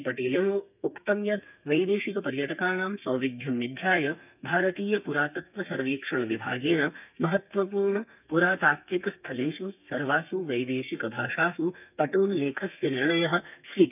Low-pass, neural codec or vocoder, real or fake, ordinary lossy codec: 3.6 kHz; codec, 32 kHz, 1.9 kbps, SNAC; fake; none